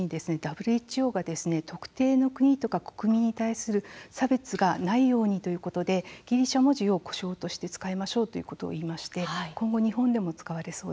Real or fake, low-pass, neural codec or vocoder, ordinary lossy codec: real; none; none; none